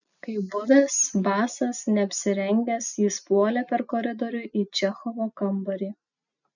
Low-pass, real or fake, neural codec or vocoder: 7.2 kHz; real; none